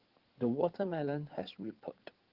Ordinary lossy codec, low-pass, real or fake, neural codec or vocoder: Opus, 16 kbps; 5.4 kHz; fake; codec, 16 kHz in and 24 kHz out, 2.2 kbps, FireRedTTS-2 codec